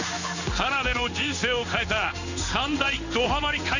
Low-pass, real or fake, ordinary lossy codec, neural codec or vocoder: 7.2 kHz; fake; none; codec, 16 kHz in and 24 kHz out, 1 kbps, XY-Tokenizer